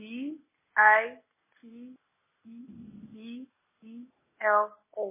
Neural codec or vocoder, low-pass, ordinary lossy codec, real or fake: none; 3.6 kHz; MP3, 16 kbps; real